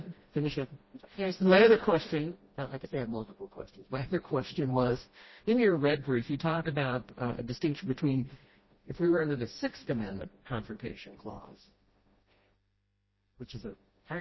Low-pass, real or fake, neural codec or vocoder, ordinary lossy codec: 7.2 kHz; fake; codec, 16 kHz, 1 kbps, FreqCodec, smaller model; MP3, 24 kbps